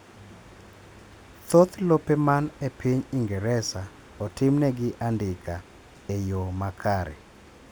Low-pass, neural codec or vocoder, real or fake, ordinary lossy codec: none; none; real; none